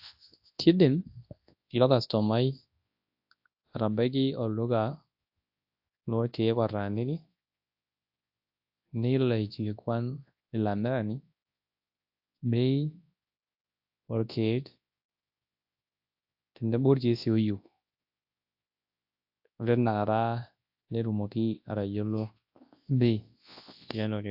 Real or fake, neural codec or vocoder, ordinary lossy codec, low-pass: fake; codec, 24 kHz, 0.9 kbps, WavTokenizer, large speech release; AAC, 48 kbps; 5.4 kHz